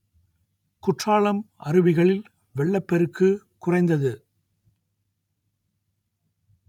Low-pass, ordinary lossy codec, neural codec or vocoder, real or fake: 19.8 kHz; none; vocoder, 44.1 kHz, 128 mel bands every 512 samples, BigVGAN v2; fake